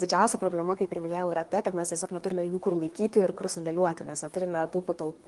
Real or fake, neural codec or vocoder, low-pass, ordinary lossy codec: fake; codec, 24 kHz, 1 kbps, SNAC; 10.8 kHz; Opus, 24 kbps